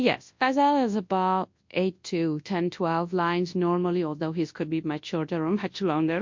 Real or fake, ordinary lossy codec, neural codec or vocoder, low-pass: fake; MP3, 48 kbps; codec, 24 kHz, 0.9 kbps, WavTokenizer, large speech release; 7.2 kHz